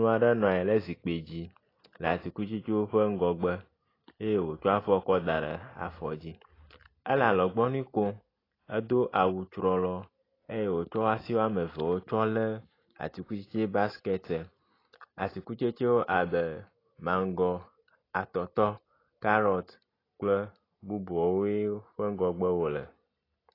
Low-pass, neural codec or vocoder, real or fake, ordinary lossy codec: 5.4 kHz; none; real; AAC, 24 kbps